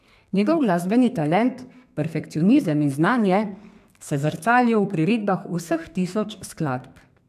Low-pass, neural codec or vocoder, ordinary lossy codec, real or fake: 14.4 kHz; codec, 32 kHz, 1.9 kbps, SNAC; AAC, 96 kbps; fake